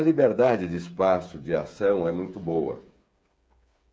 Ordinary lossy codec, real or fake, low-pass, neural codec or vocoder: none; fake; none; codec, 16 kHz, 8 kbps, FreqCodec, smaller model